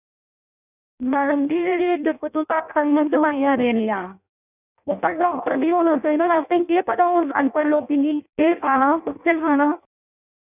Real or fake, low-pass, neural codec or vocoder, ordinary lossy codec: fake; 3.6 kHz; codec, 16 kHz in and 24 kHz out, 0.6 kbps, FireRedTTS-2 codec; none